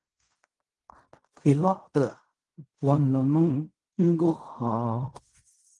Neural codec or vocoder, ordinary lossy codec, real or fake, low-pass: codec, 16 kHz in and 24 kHz out, 0.4 kbps, LongCat-Audio-Codec, fine tuned four codebook decoder; Opus, 24 kbps; fake; 10.8 kHz